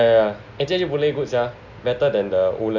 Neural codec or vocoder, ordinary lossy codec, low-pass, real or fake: none; none; 7.2 kHz; real